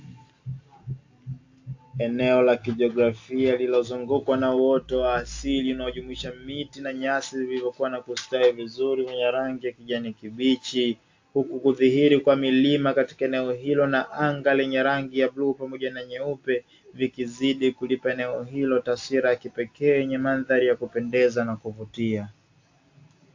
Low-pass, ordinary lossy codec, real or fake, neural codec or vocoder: 7.2 kHz; AAC, 48 kbps; real; none